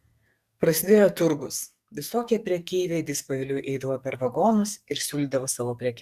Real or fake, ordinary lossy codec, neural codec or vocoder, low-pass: fake; Opus, 64 kbps; codec, 44.1 kHz, 2.6 kbps, SNAC; 14.4 kHz